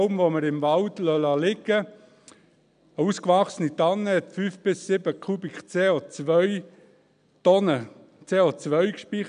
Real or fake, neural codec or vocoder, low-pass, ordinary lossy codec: real; none; 9.9 kHz; none